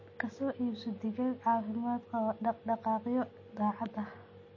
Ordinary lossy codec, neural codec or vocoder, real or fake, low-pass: MP3, 32 kbps; none; real; 7.2 kHz